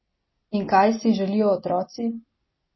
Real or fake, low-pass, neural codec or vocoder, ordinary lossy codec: real; 7.2 kHz; none; MP3, 24 kbps